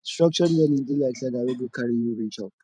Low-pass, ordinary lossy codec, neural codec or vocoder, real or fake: 9.9 kHz; none; vocoder, 44.1 kHz, 128 mel bands every 512 samples, BigVGAN v2; fake